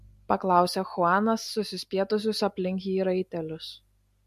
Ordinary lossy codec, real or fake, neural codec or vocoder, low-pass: MP3, 64 kbps; real; none; 14.4 kHz